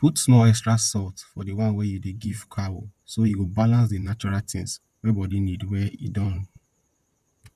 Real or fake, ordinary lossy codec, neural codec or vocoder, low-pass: fake; none; vocoder, 44.1 kHz, 128 mel bands, Pupu-Vocoder; 14.4 kHz